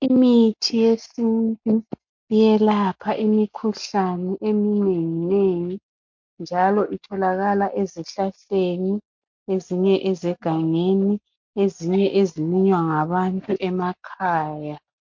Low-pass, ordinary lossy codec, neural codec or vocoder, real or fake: 7.2 kHz; MP3, 48 kbps; none; real